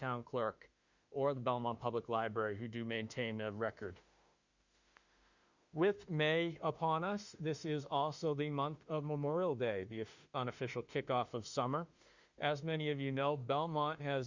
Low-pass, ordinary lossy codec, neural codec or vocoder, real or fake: 7.2 kHz; Opus, 64 kbps; autoencoder, 48 kHz, 32 numbers a frame, DAC-VAE, trained on Japanese speech; fake